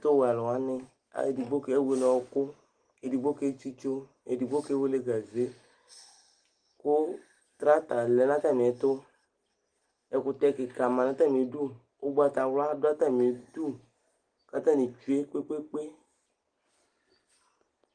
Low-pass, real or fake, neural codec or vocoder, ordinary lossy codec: 9.9 kHz; real; none; Opus, 24 kbps